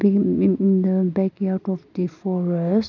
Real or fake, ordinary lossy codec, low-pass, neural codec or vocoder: real; none; 7.2 kHz; none